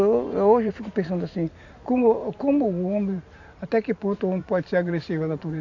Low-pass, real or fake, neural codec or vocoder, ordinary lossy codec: 7.2 kHz; fake; vocoder, 44.1 kHz, 128 mel bands every 512 samples, BigVGAN v2; none